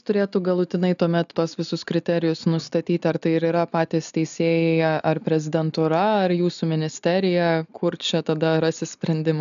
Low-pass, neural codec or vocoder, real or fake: 7.2 kHz; none; real